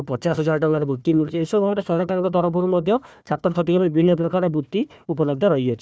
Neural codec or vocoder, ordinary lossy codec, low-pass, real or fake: codec, 16 kHz, 1 kbps, FunCodec, trained on Chinese and English, 50 frames a second; none; none; fake